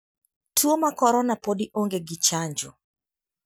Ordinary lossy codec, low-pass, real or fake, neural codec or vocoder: none; none; real; none